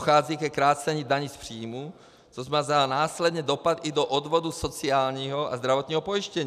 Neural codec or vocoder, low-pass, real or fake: none; 14.4 kHz; real